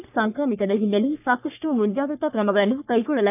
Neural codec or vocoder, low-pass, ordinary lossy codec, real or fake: codec, 44.1 kHz, 3.4 kbps, Pupu-Codec; 3.6 kHz; none; fake